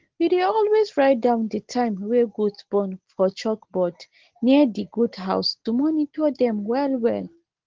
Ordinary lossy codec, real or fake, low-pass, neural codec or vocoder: Opus, 16 kbps; real; 7.2 kHz; none